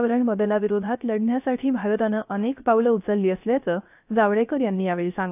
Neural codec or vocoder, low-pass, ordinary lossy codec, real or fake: codec, 16 kHz, 0.3 kbps, FocalCodec; 3.6 kHz; AAC, 32 kbps; fake